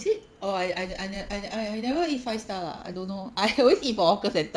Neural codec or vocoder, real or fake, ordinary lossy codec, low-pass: vocoder, 22.05 kHz, 80 mel bands, WaveNeXt; fake; none; none